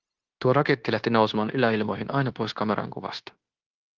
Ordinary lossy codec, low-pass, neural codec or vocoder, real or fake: Opus, 16 kbps; 7.2 kHz; codec, 16 kHz, 0.9 kbps, LongCat-Audio-Codec; fake